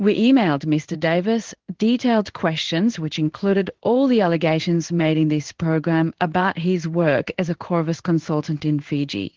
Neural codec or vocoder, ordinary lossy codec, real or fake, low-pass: codec, 16 kHz in and 24 kHz out, 1 kbps, XY-Tokenizer; Opus, 16 kbps; fake; 7.2 kHz